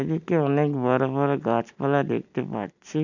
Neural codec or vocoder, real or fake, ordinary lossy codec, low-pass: none; real; none; 7.2 kHz